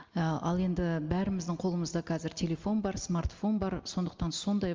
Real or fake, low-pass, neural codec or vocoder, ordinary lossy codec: real; 7.2 kHz; none; Opus, 32 kbps